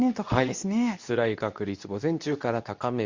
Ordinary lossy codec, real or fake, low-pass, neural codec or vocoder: Opus, 64 kbps; fake; 7.2 kHz; codec, 24 kHz, 0.9 kbps, WavTokenizer, medium speech release version 2